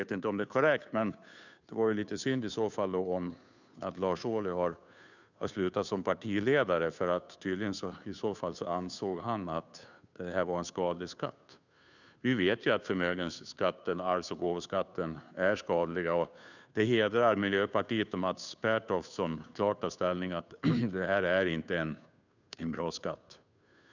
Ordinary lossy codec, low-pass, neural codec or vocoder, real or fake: none; 7.2 kHz; codec, 16 kHz, 2 kbps, FunCodec, trained on Chinese and English, 25 frames a second; fake